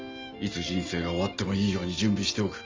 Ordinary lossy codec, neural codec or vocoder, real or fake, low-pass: Opus, 32 kbps; none; real; 7.2 kHz